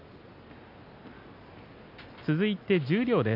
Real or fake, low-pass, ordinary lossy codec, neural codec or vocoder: real; 5.4 kHz; none; none